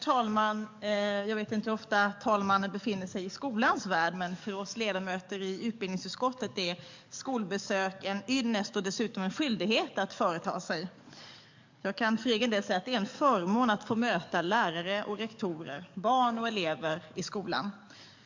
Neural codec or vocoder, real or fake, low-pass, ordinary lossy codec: codec, 44.1 kHz, 7.8 kbps, DAC; fake; 7.2 kHz; MP3, 64 kbps